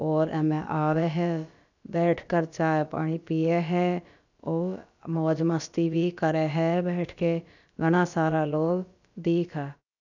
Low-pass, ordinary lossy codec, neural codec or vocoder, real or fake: 7.2 kHz; none; codec, 16 kHz, about 1 kbps, DyCAST, with the encoder's durations; fake